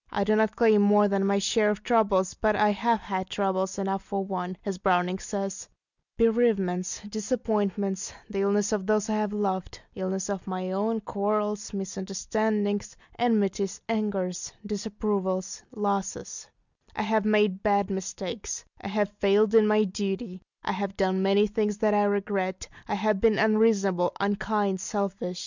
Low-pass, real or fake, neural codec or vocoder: 7.2 kHz; real; none